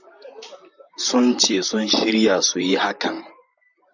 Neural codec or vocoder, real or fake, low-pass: vocoder, 44.1 kHz, 128 mel bands, Pupu-Vocoder; fake; 7.2 kHz